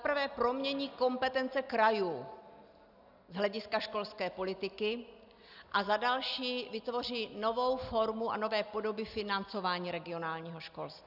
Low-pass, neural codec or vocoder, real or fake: 5.4 kHz; none; real